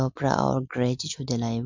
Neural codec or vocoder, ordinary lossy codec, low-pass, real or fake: none; MP3, 64 kbps; 7.2 kHz; real